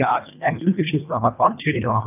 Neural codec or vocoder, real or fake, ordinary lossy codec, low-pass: codec, 24 kHz, 1.5 kbps, HILCodec; fake; none; 3.6 kHz